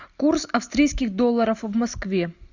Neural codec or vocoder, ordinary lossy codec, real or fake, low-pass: none; Opus, 64 kbps; real; 7.2 kHz